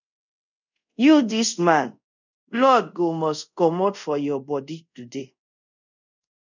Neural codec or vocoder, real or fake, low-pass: codec, 24 kHz, 0.5 kbps, DualCodec; fake; 7.2 kHz